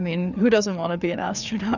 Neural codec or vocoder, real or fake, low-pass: codec, 16 kHz, 4 kbps, FreqCodec, larger model; fake; 7.2 kHz